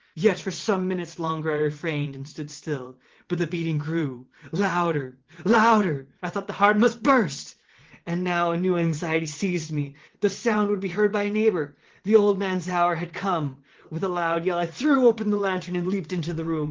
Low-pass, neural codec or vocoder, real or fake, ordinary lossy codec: 7.2 kHz; vocoder, 22.05 kHz, 80 mel bands, WaveNeXt; fake; Opus, 16 kbps